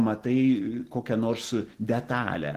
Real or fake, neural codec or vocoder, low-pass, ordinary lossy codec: real; none; 14.4 kHz; Opus, 16 kbps